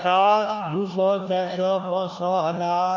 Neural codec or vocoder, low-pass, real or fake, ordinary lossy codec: codec, 16 kHz, 1 kbps, FunCodec, trained on LibriTTS, 50 frames a second; 7.2 kHz; fake; none